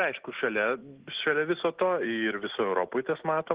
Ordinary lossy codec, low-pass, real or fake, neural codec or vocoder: Opus, 16 kbps; 3.6 kHz; real; none